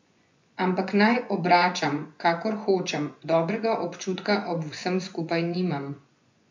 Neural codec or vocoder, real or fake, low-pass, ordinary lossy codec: vocoder, 24 kHz, 100 mel bands, Vocos; fake; 7.2 kHz; MP3, 48 kbps